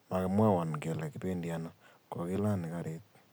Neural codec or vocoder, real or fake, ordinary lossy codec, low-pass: none; real; none; none